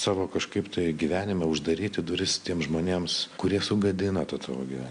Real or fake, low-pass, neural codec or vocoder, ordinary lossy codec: real; 9.9 kHz; none; MP3, 64 kbps